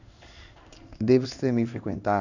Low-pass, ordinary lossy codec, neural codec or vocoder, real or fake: 7.2 kHz; none; codec, 16 kHz in and 24 kHz out, 1 kbps, XY-Tokenizer; fake